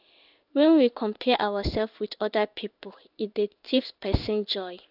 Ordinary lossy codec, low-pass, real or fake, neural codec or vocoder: none; 5.4 kHz; fake; codec, 16 kHz in and 24 kHz out, 1 kbps, XY-Tokenizer